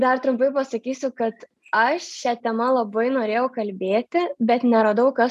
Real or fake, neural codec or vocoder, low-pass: real; none; 14.4 kHz